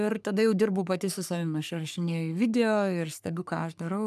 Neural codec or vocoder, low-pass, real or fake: codec, 44.1 kHz, 3.4 kbps, Pupu-Codec; 14.4 kHz; fake